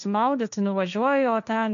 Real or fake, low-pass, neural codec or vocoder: fake; 7.2 kHz; codec, 16 kHz, 1.1 kbps, Voila-Tokenizer